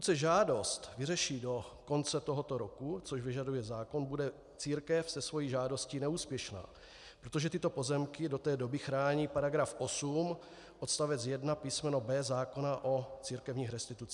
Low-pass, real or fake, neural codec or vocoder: 10.8 kHz; real; none